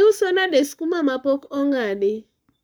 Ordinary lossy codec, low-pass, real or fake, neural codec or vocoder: none; none; fake; codec, 44.1 kHz, 7.8 kbps, Pupu-Codec